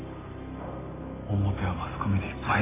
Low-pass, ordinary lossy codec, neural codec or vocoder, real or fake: 3.6 kHz; AAC, 16 kbps; none; real